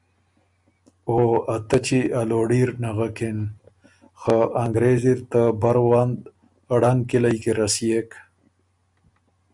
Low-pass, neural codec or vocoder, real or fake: 10.8 kHz; none; real